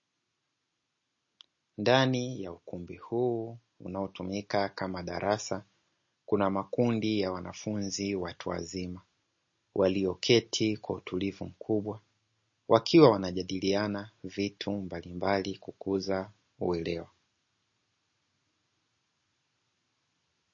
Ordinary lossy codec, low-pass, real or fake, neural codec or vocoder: MP3, 32 kbps; 7.2 kHz; real; none